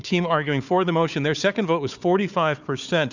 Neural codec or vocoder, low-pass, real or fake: codec, 16 kHz, 4 kbps, FunCodec, trained on Chinese and English, 50 frames a second; 7.2 kHz; fake